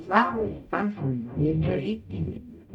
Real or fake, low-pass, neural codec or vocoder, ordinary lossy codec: fake; 19.8 kHz; codec, 44.1 kHz, 0.9 kbps, DAC; none